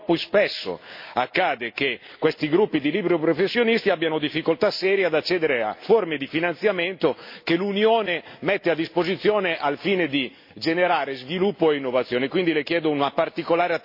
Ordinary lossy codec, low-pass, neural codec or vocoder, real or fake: MP3, 32 kbps; 5.4 kHz; none; real